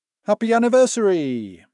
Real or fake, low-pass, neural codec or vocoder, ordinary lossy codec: fake; 10.8 kHz; autoencoder, 48 kHz, 128 numbers a frame, DAC-VAE, trained on Japanese speech; none